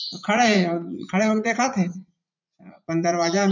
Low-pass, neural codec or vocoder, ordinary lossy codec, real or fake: 7.2 kHz; vocoder, 44.1 kHz, 80 mel bands, Vocos; none; fake